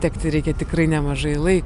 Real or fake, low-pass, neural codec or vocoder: real; 10.8 kHz; none